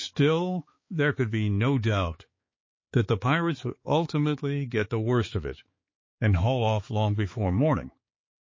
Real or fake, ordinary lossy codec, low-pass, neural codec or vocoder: fake; MP3, 32 kbps; 7.2 kHz; codec, 16 kHz, 4 kbps, X-Codec, HuBERT features, trained on balanced general audio